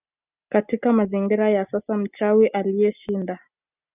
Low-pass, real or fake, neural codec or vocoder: 3.6 kHz; real; none